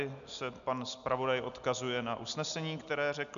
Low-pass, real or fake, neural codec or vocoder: 7.2 kHz; real; none